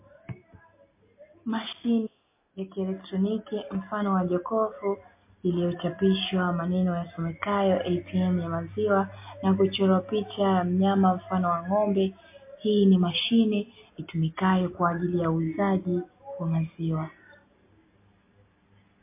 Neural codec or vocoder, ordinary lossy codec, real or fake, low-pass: none; MP3, 24 kbps; real; 3.6 kHz